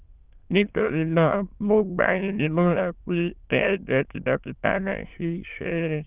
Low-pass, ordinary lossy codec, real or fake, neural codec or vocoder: 3.6 kHz; Opus, 24 kbps; fake; autoencoder, 22.05 kHz, a latent of 192 numbers a frame, VITS, trained on many speakers